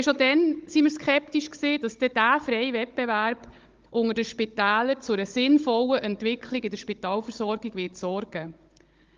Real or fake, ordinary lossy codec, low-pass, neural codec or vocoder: fake; Opus, 32 kbps; 7.2 kHz; codec, 16 kHz, 16 kbps, FunCodec, trained on Chinese and English, 50 frames a second